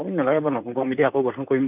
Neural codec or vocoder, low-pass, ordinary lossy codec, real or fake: vocoder, 44.1 kHz, 128 mel bands, Pupu-Vocoder; 3.6 kHz; none; fake